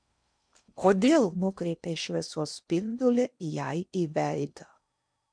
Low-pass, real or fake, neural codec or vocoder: 9.9 kHz; fake; codec, 16 kHz in and 24 kHz out, 0.6 kbps, FocalCodec, streaming, 4096 codes